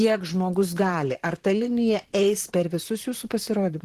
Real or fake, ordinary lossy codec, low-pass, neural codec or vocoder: fake; Opus, 16 kbps; 14.4 kHz; vocoder, 44.1 kHz, 128 mel bands, Pupu-Vocoder